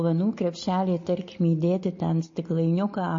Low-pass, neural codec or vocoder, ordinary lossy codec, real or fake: 7.2 kHz; none; MP3, 32 kbps; real